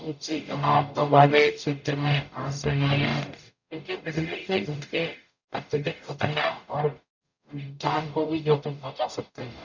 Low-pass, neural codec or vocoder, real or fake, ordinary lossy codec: 7.2 kHz; codec, 44.1 kHz, 0.9 kbps, DAC; fake; none